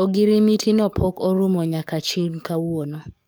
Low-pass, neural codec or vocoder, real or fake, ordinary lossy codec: none; codec, 44.1 kHz, 7.8 kbps, DAC; fake; none